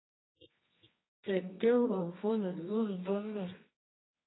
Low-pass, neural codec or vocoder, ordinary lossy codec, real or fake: 7.2 kHz; codec, 24 kHz, 0.9 kbps, WavTokenizer, medium music audio release; AAC, 16 kbps; fake